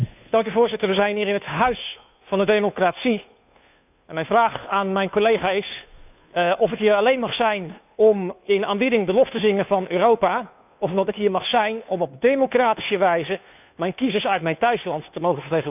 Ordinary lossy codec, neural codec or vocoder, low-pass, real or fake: none; codec, 16 kHz, 2 kbps, FunCodec, trained on Chinese and English, 25 frames a second; 3.6 kHz; fake